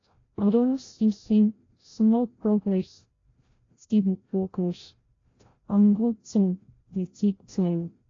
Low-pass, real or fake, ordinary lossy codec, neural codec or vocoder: 7.2 kHz; fake; AAC, 32 kbps; codec, 16 kHz, 0.5 kbps, FreqCodec, larger model